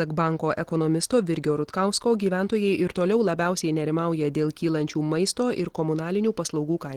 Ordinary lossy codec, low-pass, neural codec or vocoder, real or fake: Opus, 16 kbps; 19.8 kHz; none; real